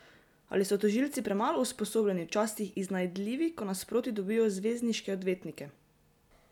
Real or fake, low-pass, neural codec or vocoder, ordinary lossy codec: real; 19.8 kHz; none; none